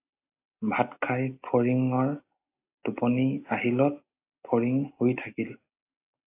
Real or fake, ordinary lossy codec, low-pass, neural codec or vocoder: real; AAC, 24 kbps; 3.6 kHz; none